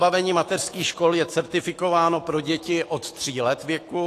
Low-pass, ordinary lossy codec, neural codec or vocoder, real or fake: 14.4 kHz; AAC, 48 kbps; autoencoder, 48 kHz, 128 numbers a frame, DAC-VAE, trained on Japanese speech; fake